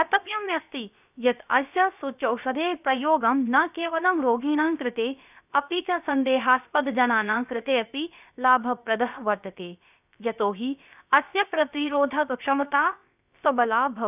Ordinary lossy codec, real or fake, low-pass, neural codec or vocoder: none; fake; 3.6 kHz; codec, 16 kHz, about 1 kbps, DyCAST, with the encoder's durations